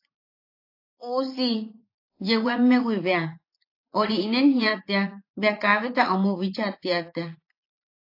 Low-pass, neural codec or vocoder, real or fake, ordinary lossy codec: 5.4 kHz; vocoder, 44.1 kHz, 80 mel bands, Vocos; fake; AAC, 32 kbps